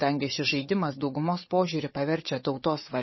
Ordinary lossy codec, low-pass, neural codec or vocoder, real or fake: MP3, 24 kbps; 7.2 kHz; codec, 16 kHz, 4 kbps, FunCodec, trained on Chinese and English, 50 frames a second; fake